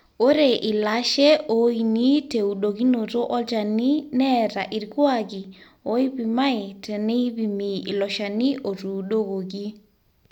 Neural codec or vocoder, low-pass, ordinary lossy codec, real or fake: none; 19.8 kHz; none; real